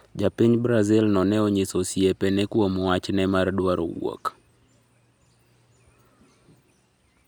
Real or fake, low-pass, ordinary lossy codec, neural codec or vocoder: real; none; none; none